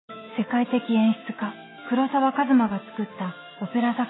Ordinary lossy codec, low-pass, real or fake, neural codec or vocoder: AAC, 16 kbps; 7.2 kHz; real; none